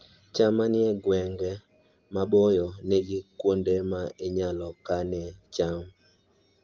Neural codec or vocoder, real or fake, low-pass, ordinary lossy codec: none; real; 7.2 kHz; Opus, 32 kbps